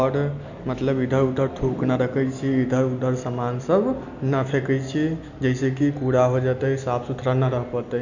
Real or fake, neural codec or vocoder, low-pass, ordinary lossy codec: real; none; 7.2 kHz; none